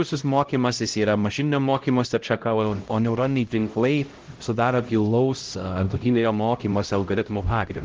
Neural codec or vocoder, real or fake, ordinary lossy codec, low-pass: codec, 16 kHz, 0.5 kbps, X-Codec, HuBERT features, trained on LibriSpeech; fake; Opus, 16 kbps; 7.2 kHz